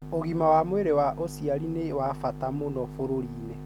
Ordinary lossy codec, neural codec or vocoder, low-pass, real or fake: none; none; 19.8 kHz; real